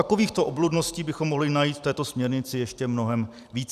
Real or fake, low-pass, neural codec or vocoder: fake; 14.4 kHz; vocoder, 44.1 kHz, 128 mel bands every 256 samples, BigVGAN v2